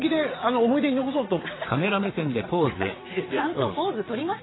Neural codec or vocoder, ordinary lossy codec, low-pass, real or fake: vocoder, 22.05 kHz, 80 mel bands, WaveNeXt; AAC, 16 kbps; 7.2 kHz; fake